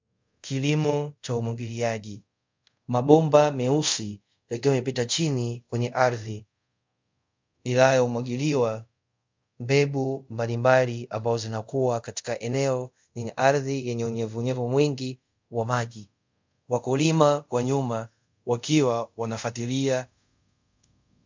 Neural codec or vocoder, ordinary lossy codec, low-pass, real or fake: codec, 24 kHz, 0.5 kbps, DualCodec; MP3, 64 kbps; 7.2 kHz; fake